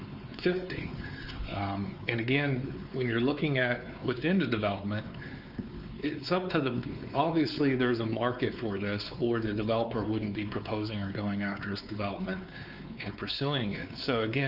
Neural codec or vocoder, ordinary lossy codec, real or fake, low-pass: codec, 16 kHz, 4 kbps, X-Codec, WavLM features, trained on Multilingual LibriSpeech; Opus, 24 kbps; fake; 5.4 kHz